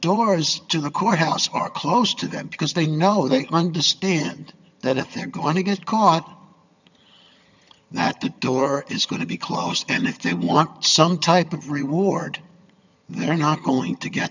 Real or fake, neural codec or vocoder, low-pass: fake; vocoder, 22.05 kHz, 80 mel bands, HiFi-GAN; 7.2 kHz